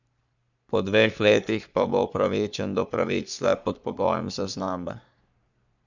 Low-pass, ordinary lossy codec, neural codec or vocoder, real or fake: 7.2 kHz; none; codec, 44.1 kHz, 3.4 kbps, Pupu-Codec; fake